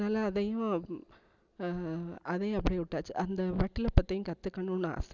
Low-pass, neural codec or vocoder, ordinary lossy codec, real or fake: 7.2 kHz; none; none; real